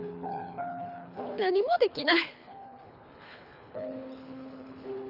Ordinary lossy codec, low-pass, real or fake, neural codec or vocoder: none; 5.4 kHz; fake; codec, 24 kHz, 3 kbps, HILCodec